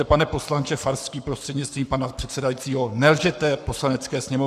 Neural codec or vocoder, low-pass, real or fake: codec, 44.1 kHz, 7.8 kbps, Pupu-Codec; 14.4 kHz; fake